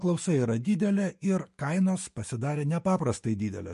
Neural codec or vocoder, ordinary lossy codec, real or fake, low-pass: autoencoder, 48 kHz, 128 numbers a frame, DAC-VAE, trained on Japanese speech; MP3, 48 kbps; fake; 14.4 kHz